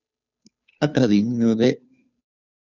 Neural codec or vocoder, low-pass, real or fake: codec, 16 kHz, 2 kbps, FunCodec, trained on Chinese and English, 25 frames a second; 7.2 kHz; fake